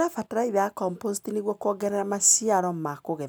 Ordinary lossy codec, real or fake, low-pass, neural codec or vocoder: none; real; none; none